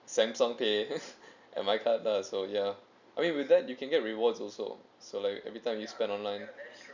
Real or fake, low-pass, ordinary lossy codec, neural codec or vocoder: real; 7.2 kHz; none; none